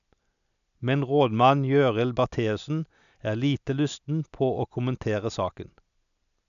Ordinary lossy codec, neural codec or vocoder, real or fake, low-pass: none; none; real; 7.2 kHz